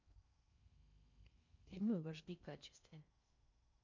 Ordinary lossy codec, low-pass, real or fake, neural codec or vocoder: MP3, 64 kbps; 7.2 kHz; fake; codec, 16 kHz in and 24 kHz out, 0.6 kbps, FocalCodec, streaming, 4096 codes